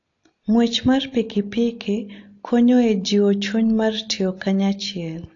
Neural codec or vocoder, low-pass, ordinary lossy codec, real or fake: none; 7.2 kHz; AAC, 48 kbps; real